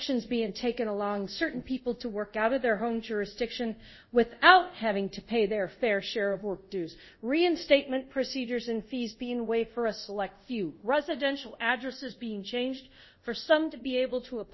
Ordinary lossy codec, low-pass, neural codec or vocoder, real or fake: MP3, 24 kbps; 7.2 kHz; codec, 24 kHz, 0.5 kbps, DualCodec; fake